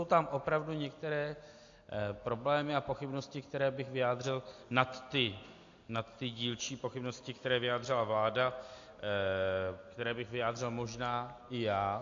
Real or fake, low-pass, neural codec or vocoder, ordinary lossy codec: real; 7.2 kHz; none; AAC, 48 kbps